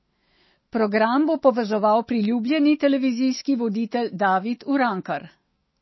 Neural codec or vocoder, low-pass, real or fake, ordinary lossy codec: autoencoder, 48 kHz, 128 numbers a frame, DAC-VAE, trained on Japanese speech; 7.2 kHz; fake; MP3, 24 kbps